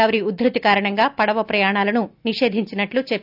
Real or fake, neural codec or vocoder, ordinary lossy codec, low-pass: real; none; none; 5.4 kHz